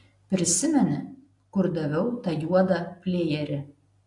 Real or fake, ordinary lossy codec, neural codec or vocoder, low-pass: real; AAC, 48 kbps; none; 10.8 kHz